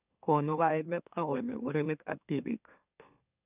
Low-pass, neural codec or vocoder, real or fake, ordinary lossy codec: 3.6 kHz; autoencoder, 44.1 kHz, a latent of 192 numbers a frame, MeloTTS; fake; none